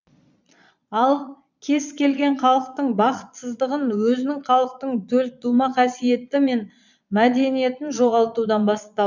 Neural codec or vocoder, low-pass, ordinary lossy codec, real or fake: vocoder, 22.05 kHz, 80 mel bands, Vocos; 7.2 kHz; none; fake